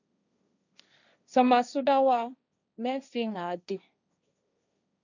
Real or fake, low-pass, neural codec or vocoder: fake; 7.2 kHz; codec, 16 kHz, 1.1 kbps, Voila-Tokenizer